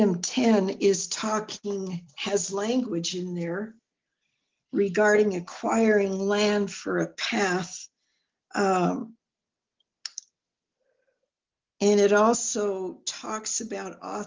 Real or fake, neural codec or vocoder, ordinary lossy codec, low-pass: real; none; Opus, 32 kbps; 7.2 kHz